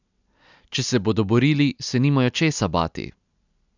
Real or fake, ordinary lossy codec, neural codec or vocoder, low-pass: real; none; none; 7.2 kHz